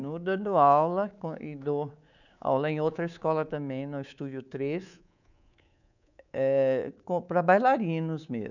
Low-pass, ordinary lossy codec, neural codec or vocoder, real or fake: 7.2 kHz; none; codec, 24 kHz, 3.1 kbps, DualCodec; fake